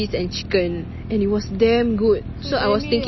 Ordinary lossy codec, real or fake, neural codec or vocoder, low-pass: MP3, 24 kbps; real; none; 7.2 kHz